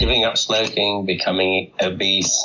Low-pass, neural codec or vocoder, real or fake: 7.2 kHz; none; real